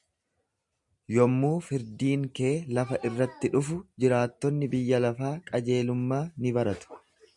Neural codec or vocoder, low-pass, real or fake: none; 10.8 kHz; real